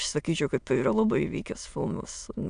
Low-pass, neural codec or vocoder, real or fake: 9.9 kHz; autoencoder, 22.05 kHz, a latent of 192 numbers a frame, VITS, trained on many speakers; fake